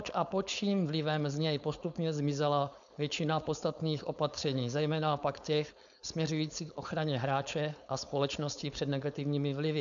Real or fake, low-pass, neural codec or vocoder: fake; 7.2 kHz; codec, 16 kHz, 4.8 kbps, FACodec